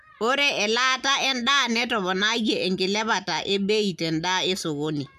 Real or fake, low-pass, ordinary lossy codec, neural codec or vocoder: real; 14.4 kHz; none; none